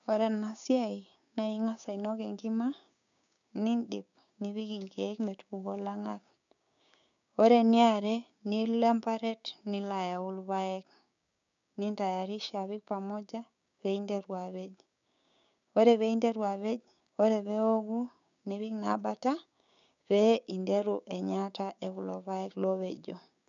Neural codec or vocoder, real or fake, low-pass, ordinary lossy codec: codec, 16 kHz, 6 kbps, DAC; fake; 7.2 kHz; none